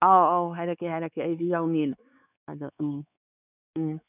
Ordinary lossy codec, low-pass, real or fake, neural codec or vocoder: none; 3.6 kHz; fake; codec, 16 kHz, 4 kbps, X-Codec, WavLM features, trained on Multilingual LibriSpeech